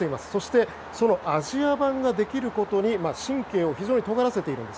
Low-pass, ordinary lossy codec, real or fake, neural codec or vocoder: none; none; real; none